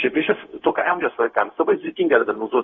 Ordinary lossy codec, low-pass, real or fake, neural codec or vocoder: AAC, 24 kbps; 7.2 kHz; fake; codec, 16 kHz, 0.4 kbps, LongCat-Audio-Codec